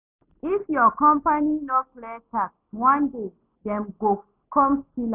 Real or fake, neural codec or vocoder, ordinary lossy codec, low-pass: real; none; none; 3.6 kHz